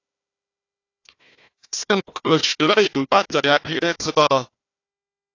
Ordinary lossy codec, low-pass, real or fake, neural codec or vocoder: AAC, 48 kbps; 7.2 kHz; fake; codec, 16 kHz, 1 kbps, FunCodec, trained on Chinese and English, 50 frames a second